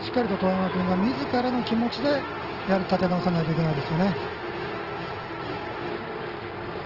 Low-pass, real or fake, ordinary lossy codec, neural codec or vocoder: 5.4 kHz; real; Opus, 16 kbps; none